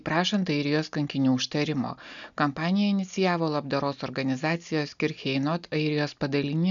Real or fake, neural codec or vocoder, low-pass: real; none; 7.2 kHz